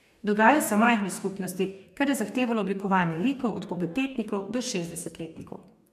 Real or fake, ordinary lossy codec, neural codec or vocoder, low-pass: fake; MP3, 96 kbps; codec, 44.1 kHz, 2.6 kbps, DAC; 14.4 kHz